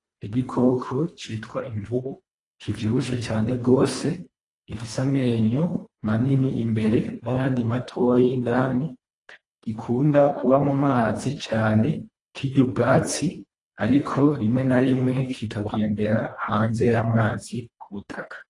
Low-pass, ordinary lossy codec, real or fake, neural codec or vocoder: 10.8 kHz; AAC, 32 kbps; fake; codec, 24 kHz, 1.5 kbps, HILCodec